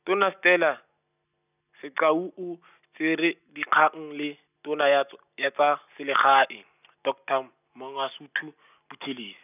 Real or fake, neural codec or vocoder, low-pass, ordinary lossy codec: real; none; 3.6 kHz; none